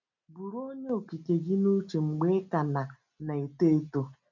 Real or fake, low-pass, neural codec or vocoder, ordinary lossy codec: real; 7.2 kHz; none; none